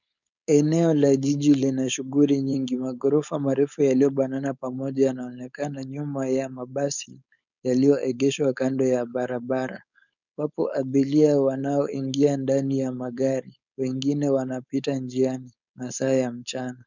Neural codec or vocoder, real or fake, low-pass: codec, 16 kHz, 4.8 kbps, FACodec; fake; 7.2 kHz